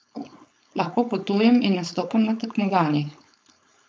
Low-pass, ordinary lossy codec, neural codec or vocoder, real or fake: none; none; codec, 16 kHz, 4.8 kbps, FACodec; fake